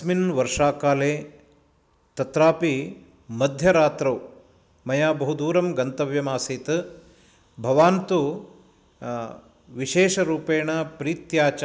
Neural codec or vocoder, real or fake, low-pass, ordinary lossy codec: none; real; none; none